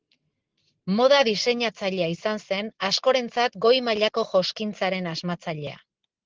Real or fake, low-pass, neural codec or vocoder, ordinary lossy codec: real; 7.2 kHz; none; Opus, 16 kbps